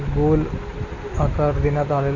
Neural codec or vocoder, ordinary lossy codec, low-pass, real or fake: none; none; 7.2 kHz; real